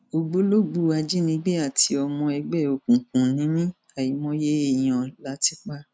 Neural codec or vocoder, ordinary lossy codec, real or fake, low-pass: none; none; real; none